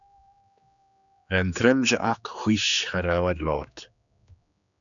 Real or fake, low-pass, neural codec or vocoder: fake; 7.2 kHz; codec, 16 kHz, 2 kbps, X-Codec, HuBERT features, trained on general audio